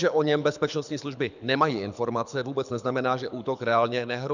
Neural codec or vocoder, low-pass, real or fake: codec, 24 kHz, 6 kbps, HILCodec; 7.2 kHz; fake